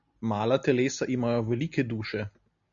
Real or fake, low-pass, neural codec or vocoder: real; 7.2 kHz; none